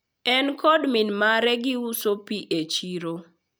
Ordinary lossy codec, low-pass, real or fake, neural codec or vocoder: none; none; real; none